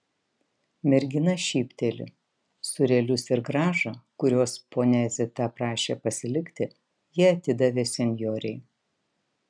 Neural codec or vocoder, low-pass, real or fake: none; 9.9 kHz; real